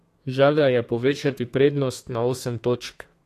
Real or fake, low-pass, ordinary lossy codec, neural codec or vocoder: fake; 14.4 kHz; AAC, 64 kbps; codec, 32 kHz, 1.9 kbps, SNAC